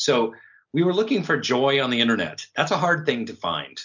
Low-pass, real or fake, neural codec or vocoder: 7.2 kHz; real; none